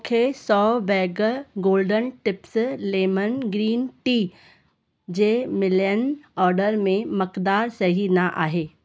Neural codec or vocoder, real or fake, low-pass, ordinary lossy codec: none; real; none; none